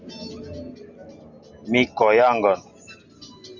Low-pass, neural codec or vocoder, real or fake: 7.2 kHz; none; real